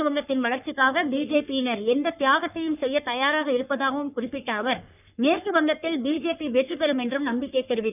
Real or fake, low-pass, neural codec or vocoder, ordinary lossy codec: fake; 3.6 kHz; codec, 44.1 kHz, 3.4 kbps, Pupu-Codec; none